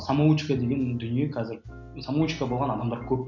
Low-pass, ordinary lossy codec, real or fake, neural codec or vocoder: 7.2 kHz; none; real; none